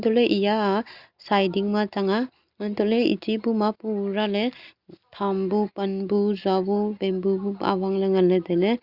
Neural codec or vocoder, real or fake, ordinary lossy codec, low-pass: none; real; Opus, 64 kbps; 5.4 kHz